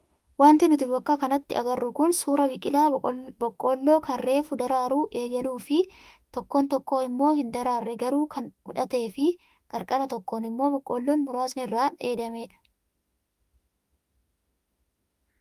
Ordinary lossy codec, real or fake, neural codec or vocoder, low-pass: Opus, 32 kbps; fake; autoencoder, 48 kHz, 32 numbers a frame, DAC-VAE, trained on Japanese speech; 14.4 kHz